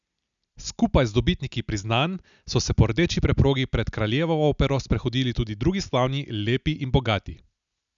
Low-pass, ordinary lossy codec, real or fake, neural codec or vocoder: 7.2 kHz; none; real; none